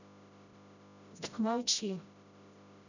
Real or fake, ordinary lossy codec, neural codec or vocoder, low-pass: fake; none; codec, 16 kHz, 0.5 kbps, FreqCodec, smaller model; 7.2 kHz